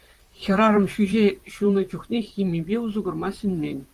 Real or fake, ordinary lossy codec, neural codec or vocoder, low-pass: fake; Opus, 32 kbps; vocoder, 44.1 kHz, 128 mel bands, Pupu-Vocoder; 14.4 kHz